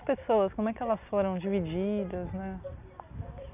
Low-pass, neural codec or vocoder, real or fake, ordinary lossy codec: 3.6 kHz; none; real; none